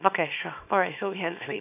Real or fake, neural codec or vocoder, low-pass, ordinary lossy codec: fake; codec, 24 kHz, 0.9 kbps, WavTokenizer, small release; 3.6 kHz; none